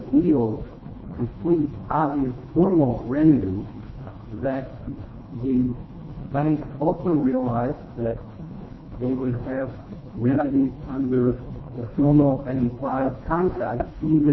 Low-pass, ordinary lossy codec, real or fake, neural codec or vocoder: 7.2 kHz; MP3, 24 kbps; fake; codec, 24 kHz, 1.5 kbps, HILCodec